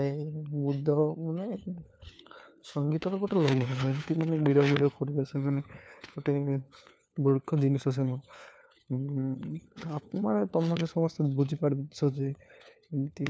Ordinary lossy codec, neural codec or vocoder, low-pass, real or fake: none; codec, 16 kHz, 4 kbps, FunCodec, trained on LibriTTS, 50 frames a second; none; fake